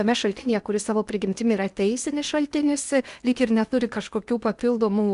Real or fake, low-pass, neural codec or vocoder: fake; 10.8 kHz; codec, 16 kHz in and 24 kHz out, 0.8 kbps, FocalCodec, streaming, 65536 codes